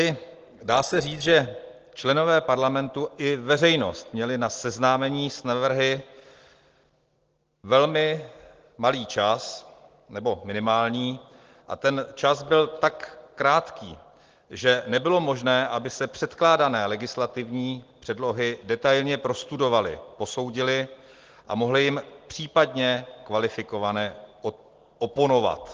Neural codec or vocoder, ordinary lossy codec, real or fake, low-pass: none; Opus, 16 kbps; real; 7.2 kHz